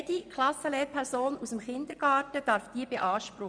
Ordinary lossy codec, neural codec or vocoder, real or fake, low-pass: none; vocoder, 24 kHz, 100 mel bands, Vocos; fake; 9.9 kHz